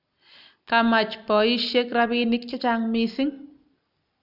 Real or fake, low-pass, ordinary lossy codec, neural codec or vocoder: real; 5.4 kHz; none; none